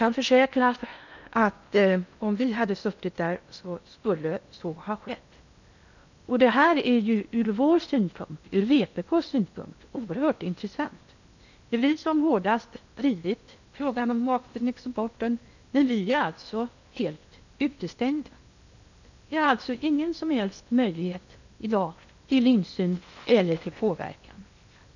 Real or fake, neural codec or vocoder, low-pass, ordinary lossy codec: fake; codec, 16 kHz in and 24 kHz out, 0.8 kbps, FocalCodec, streaming, 65536 codes; 7.2 kHz; none